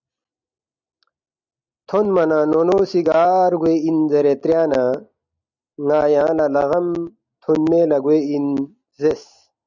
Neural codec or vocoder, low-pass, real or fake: none; 7.2 kHz; real